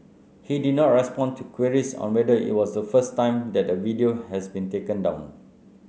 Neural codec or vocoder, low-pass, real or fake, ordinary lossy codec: none; none; real; none